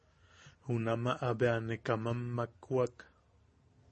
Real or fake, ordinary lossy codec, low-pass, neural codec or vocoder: real; MP3, 32 kbps; 10.8 kHz; none